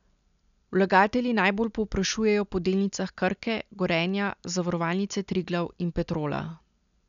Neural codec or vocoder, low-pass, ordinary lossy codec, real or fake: none; 7.2 kHz; none; real